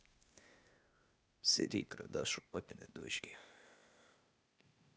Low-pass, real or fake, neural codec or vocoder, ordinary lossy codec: none; fake; codec, 16 kHz, 0.8 kbps, ZipCodec; none